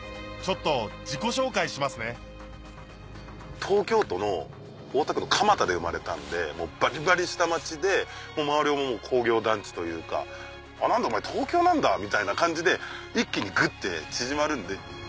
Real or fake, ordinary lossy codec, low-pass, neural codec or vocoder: real; none; none; none